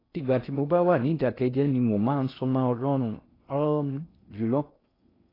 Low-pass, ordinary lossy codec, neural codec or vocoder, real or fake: 5.4 kHz; AAC, 24 kbps; codec, 16 kHz in and 24 kHz out, 0.6 kbps, FocalCodec, streaming, 2048 codes; fake